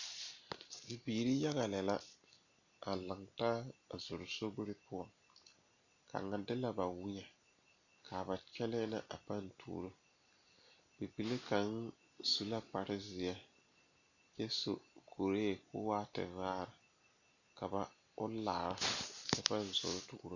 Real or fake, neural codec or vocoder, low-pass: real; none; 7.2 kHz